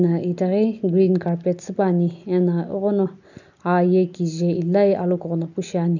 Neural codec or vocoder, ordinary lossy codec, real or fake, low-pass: none; none; real; 7.2 kHz